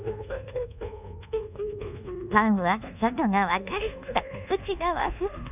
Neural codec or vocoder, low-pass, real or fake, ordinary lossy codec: codec, 24 kHz, 1.2 kbps, DualCodec; 3.6 kHz; fake; none